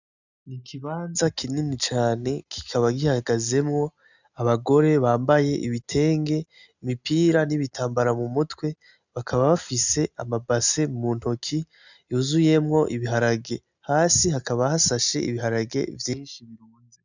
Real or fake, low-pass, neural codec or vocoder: real; 7.2 kHz; none